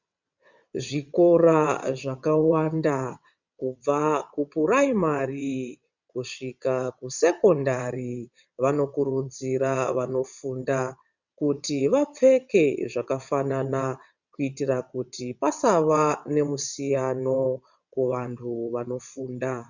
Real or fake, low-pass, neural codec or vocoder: fake; 7.2 kHz; vocoder, 22.05 kHz, 80 mel bands, WaveNeXt